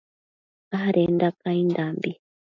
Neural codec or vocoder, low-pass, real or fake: none; 7.2 kHz; real